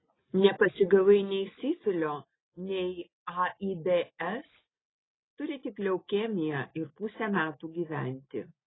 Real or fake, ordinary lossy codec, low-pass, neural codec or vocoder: real; AAC, 16 kbps; 7.2 kHz; none